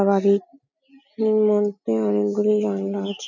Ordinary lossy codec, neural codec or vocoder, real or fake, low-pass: none; none; real; 7.2 kHz